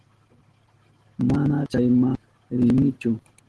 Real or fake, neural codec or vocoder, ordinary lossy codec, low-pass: real; none; Opus, 16 kbps; 10.8 kHz